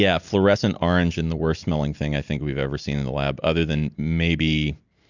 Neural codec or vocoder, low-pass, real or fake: none; 7.2 kHz; real